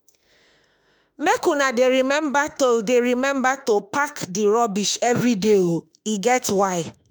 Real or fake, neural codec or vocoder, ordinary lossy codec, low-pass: fake; autoencoder, 48 kHz, 32 numbers a frame, DAC-VAE, trained on Japanese speech; none; none